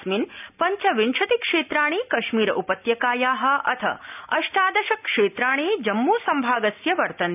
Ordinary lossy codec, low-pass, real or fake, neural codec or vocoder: none; 3.6 kHz; real; none